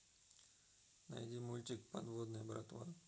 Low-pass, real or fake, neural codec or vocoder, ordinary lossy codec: none; real; none; none